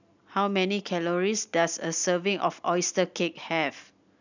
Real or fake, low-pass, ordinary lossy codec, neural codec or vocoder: real; 7.2 kHz; none; none